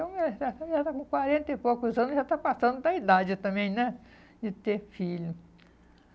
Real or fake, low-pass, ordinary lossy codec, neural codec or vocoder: real; none; none; none